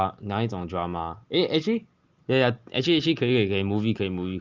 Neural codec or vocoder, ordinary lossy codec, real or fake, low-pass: none; Opus, 32 kbps; real; 7.2 kHz